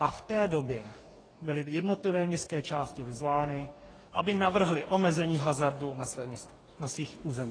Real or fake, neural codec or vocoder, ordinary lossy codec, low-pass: fake; codec, 44.1 kHz, 2.6 kbps, DAC; AAC, 32 kbps; 9.9 kHz